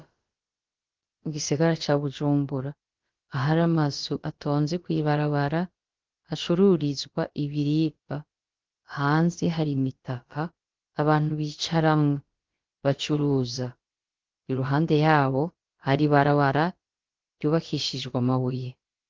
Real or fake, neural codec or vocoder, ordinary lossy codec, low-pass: fake; codec, 16 kHz, about 1 kbps, DyCAST, with the encoder's durations; Opus, 16 kbps; 7.2 kHz